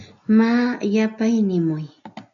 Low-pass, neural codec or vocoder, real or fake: 7.2 kHz; none; real